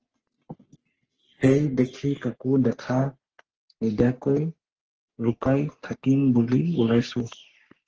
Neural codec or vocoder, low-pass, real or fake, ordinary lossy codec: codec, 44.1 kHz, 3.4 kbps, Pupu-Codec; 7.2 kHz; fake; Opus, 16 kbps